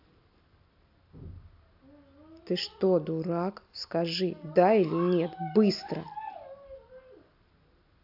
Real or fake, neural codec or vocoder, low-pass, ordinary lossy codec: real; none; 5.4 kHz; AAC, 48 kbps